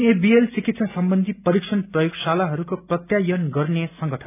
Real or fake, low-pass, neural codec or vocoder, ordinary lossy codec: real; 3.6 kHz; none; none